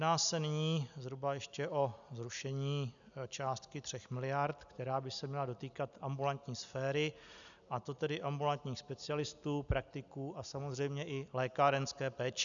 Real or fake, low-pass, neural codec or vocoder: real; 7.2 kHz; none